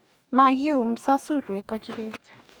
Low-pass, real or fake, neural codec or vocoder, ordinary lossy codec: none; fake; codec, 44.1 kHz, 2.6 kbps, DAC; none